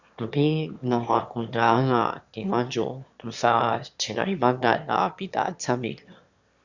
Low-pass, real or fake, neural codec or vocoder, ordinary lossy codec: 7.2 kHz; fake; autoencoder, 22.05 kHz, a latent of 192 numbers a frame, VITS, trained on one speaker; Opus, 64 kbps